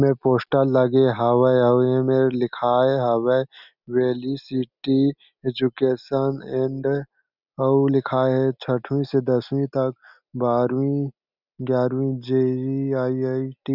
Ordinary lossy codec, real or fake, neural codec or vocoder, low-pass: none; real; none; 5.4 kHz